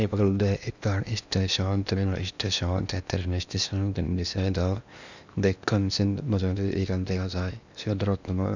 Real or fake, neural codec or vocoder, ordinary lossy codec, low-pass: fake; codec, 16 kHz in and 24 kHz out, 0.8 kbps, FocalCodec, streaming, 65536 codes; none; 7.2 kHz